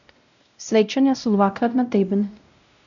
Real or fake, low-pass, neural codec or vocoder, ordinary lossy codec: fake; 7.2 kHz; codec, 16 kHz, 0.5 kbps, X-Codec, WavLM features, trained on Multilingual LibriSpeech; none